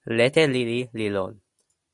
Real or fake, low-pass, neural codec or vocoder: real; 10.8 kHz; none